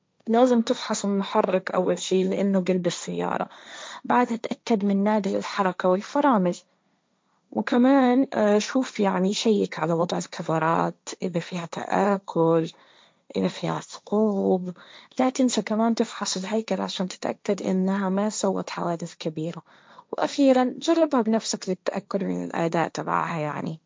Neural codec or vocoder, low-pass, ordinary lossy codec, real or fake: codec, 16 kHz, 1.1 kbps, Voila-Tokenizer; none; none; fake